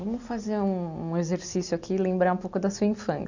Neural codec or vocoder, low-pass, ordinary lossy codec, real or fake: none; 7.2 kHz; none; real